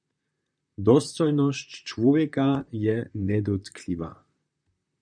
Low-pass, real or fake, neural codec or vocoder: 9.9 kHz; fake; vocoder, 44.1 kHz, 128 mel bands, Pupu-Vocoder